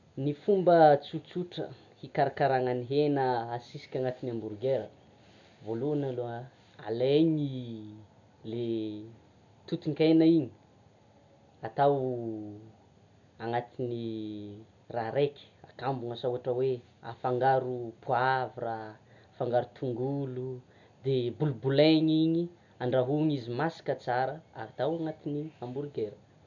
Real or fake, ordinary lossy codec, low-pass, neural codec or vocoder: real; none; 7.2 kHz; none